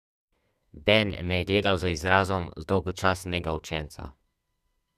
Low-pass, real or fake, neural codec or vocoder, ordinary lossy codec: 14.4 kHz; fake; codec, 32 kHz, 1.9 kbps, SNAC; none